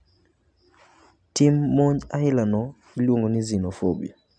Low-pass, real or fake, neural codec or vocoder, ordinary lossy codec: 9.9 kHz; real; none; none